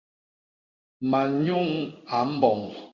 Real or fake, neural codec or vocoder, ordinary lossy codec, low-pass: fake; codec, 16 kHz in and 24 kHz out, 1 kbps, XY-Tokenizer; Opus, 64 kbps; 7.2 kHz